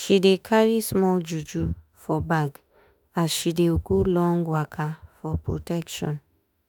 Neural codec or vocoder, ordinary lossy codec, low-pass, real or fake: autoencoder, 48 kHz, 32 numbers a frame, DAC-VAE, trained on Japanese speech; none; none; fake